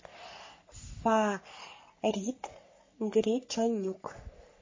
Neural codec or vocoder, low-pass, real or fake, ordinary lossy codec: codec, 44.1 kHz, 3.4 kbps, Pupu-Codec; 7.2 kHz; fake; MP3, 32 kbps